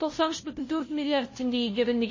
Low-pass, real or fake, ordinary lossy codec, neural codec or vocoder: 7.2 kHz; fake; MP3, 32 kbps; codec, 16 kHz, 0.5 kbps, FunCodec, trained on LibriTTS, 25 frames a second